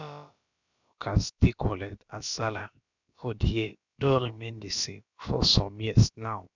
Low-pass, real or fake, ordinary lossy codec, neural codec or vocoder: 7.2 kHz; fake; none; codec, 16 kHz, about 1 kbps, DyCAST, with the encoder's durations